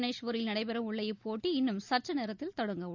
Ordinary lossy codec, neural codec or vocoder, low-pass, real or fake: none; none; 7.2 kHz; real